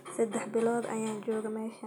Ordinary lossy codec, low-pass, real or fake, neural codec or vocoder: none; 19.8 kHz; real; none